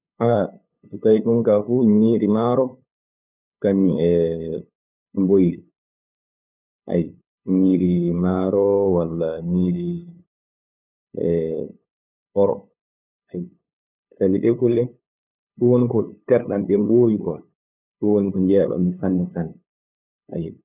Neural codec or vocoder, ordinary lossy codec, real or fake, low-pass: codec, 16 kHz, 8 kbps, FunCodec, trained on LibriTTS, 25 frames a second; none; fake; 3.6 kHz